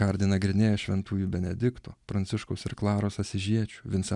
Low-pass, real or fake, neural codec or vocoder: 10.8 kHz; real; none